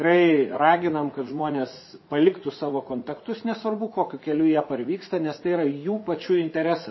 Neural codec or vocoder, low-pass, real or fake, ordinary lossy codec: vocoder, 44.1 kHz, 80 mel bands, Vocos; 7.2 kHz; fake; MP3, 24 kbps